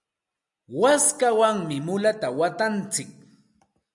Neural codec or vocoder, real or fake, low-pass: none; real; 10.8 kHz